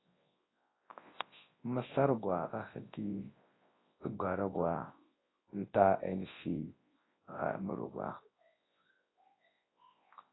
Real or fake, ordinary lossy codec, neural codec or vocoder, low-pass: fake; AAC, 16 kbps; codec, 24 kHz, 0.9 kbps, WavTokenizer, large speech release; 7.2 kHz